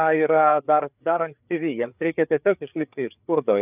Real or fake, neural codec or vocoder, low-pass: fake; codec, 16 kHz, 4 kbps, FreqCodec, larger model; 3.6 kHz